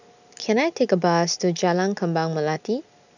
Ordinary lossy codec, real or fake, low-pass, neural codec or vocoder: none; real; 7.2 kHz; none